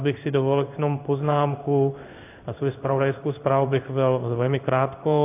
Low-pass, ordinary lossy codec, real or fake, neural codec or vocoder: 3.6 kHz; AAC, 32 kbps; fake; codec, 16 kHz in and 24 kHz out, 1 kbps, XY-Tokenizer